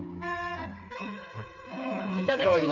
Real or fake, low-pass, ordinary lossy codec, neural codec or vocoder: fake; 7.2 kHz; none; codec, 16 kHz, 4 kbps, FreqCodec, smaller model